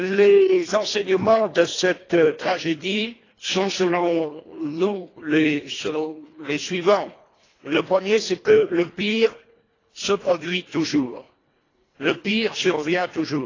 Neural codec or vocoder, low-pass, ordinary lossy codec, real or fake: codec, 24 kHz, 1.5 kbps, HILCodec; 7.2 kHz; AAC, 32 kbps; fake